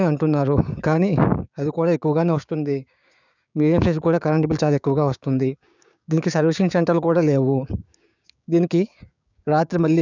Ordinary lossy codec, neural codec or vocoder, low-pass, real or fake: none; codec, 16 kHz, 6 kbps, DAC; 7.2 kHz; fake